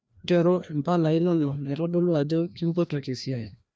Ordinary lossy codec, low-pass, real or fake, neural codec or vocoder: none; none; fake; codec, 16 kHz, 1 kbps, FreqCodec, larger model